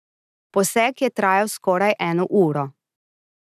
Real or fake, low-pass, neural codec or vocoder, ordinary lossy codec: real; 14.4 kHz; none; none